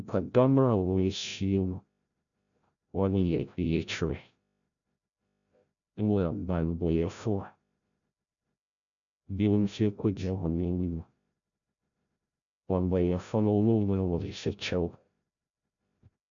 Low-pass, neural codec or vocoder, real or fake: 7.2 kHz; codec, 16 kHz, 0.5 kbps, FreqCodec, larger model; fake